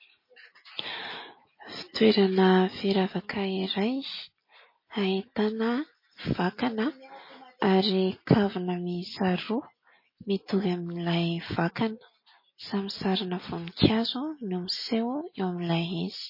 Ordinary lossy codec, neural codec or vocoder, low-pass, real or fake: MP3, 24 kbps; none; 5.4 kHz; real